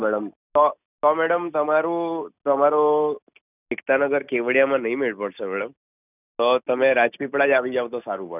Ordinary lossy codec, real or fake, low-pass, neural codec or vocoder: none; real; 3.6 kHz; none